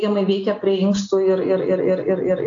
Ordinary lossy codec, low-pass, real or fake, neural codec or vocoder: AAC, 64 kbps; 7.2 kHz; real; none